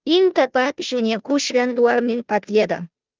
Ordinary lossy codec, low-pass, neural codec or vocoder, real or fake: Opus, 32 kbps; 7.2 kHz; codec, 16 kHz, 0.5 kbps, FunCodec, trained on Chinese and English, 25 frames a second; fake